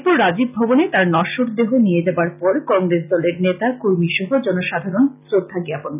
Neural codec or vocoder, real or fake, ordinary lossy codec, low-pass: none; real; none; 3.6 kHz